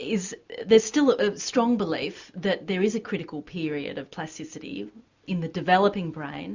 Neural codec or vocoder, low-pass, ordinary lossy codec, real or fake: none; 7.2 kHz; Opus, 64 kbps; real